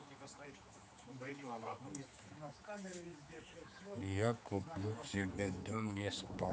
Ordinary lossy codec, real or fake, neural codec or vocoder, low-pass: none; fake; codec, 16 kHz, 4 kbps, X-Codec, HuBERT features, trained on general audio; none